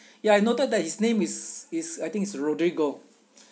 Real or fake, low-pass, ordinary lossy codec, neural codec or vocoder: real; none; none; none